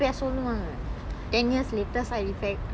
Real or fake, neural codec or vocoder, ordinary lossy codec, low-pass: real; none; none; none